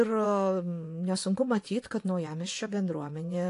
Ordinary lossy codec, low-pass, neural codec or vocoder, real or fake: AAC, 48 kbps; 10.8 kHz; vocoder, 24 kHz, 100 mel bands, Vocos; fake